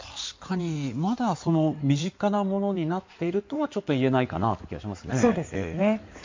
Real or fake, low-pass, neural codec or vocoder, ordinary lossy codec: fake; 7.2 kHz; codec, 16 kHz in and 24 kHz out, 2.2 kbps, FireRedTTS-2 codec; MP3, 48 kbps